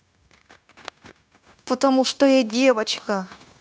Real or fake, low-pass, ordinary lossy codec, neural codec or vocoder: fake; none; none; codec, 16 kHz, 0.9 kbps, LongCat-Audio-Codec